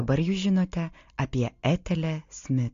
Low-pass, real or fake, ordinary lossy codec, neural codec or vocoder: 7.2 kHz; real; AAC, 48 kbps; none